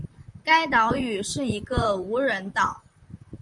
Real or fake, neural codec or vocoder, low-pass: fake; vocoder, 44.1 kHz, 128 mel bands, Pupu-Vocoder; 10.8 kHz